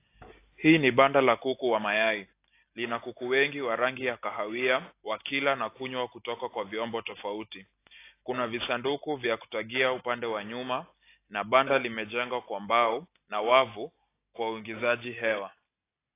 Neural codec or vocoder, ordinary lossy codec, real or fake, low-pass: none; AAC, 24 kbps; real; 3.6 kHz